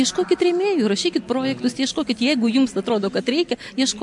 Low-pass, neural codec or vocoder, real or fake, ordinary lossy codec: 10.8 kHz; none; real; MP3, 64 kbps